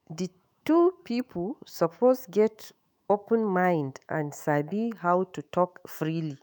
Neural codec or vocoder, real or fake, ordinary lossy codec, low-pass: autoencoder, 48 kHz, 128 numbers a frame, DAC-VAE, trained on Japanese speech; fake; none; none